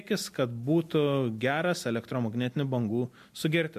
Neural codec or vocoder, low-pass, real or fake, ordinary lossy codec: none; 14.4 kHz; real; MP3, 64 kbps